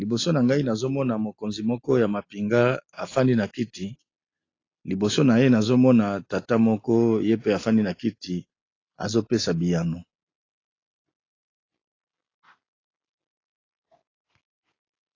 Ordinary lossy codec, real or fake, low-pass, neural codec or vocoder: AAC, 32 kbps; real; 7.2 kHz; none